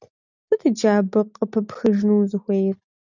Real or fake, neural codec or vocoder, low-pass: real; none; 7.2 kHz